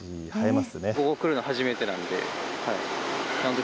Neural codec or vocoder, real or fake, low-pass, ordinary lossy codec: none; real; none; none